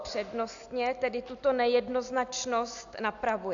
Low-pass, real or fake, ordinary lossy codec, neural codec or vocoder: 7.2 kHz; real; AAC, 64 kbps; none